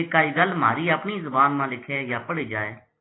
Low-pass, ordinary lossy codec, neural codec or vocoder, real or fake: 7.2 kHz; AAC, 16 kbps; none; real